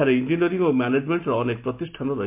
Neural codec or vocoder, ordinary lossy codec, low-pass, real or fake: none; MP3, 32 kbps; 3.6 kHz; real